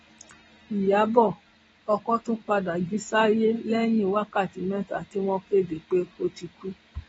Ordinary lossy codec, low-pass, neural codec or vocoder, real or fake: AAC, 24 kbps; 10.8 kHz; none; real